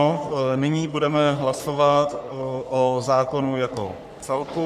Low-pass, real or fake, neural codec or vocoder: 14.4 kHz; fake; codec, 44.1 kHz, 3.4 kbps, Pupu-Codec